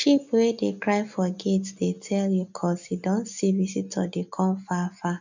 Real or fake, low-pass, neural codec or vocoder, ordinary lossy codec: real; 7.2 kHz; none; none